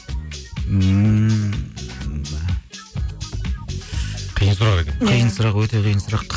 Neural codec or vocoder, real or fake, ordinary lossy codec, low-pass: none; real; none; none